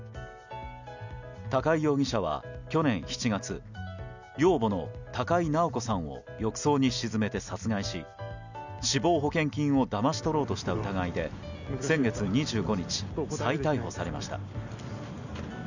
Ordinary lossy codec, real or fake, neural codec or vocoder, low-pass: none; real; none; 7.2 kHz